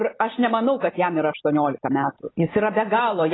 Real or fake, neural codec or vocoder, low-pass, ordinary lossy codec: real; none; 7.2 kHz; AAC, 16 kbps